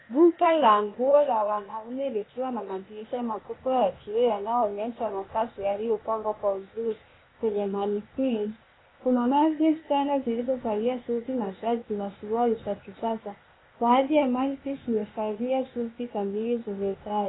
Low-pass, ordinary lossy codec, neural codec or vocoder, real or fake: 7.2 kHz; AAC, 16 kbps; codec, 16 kHz, 0.8 kbps, ZipCodec; fake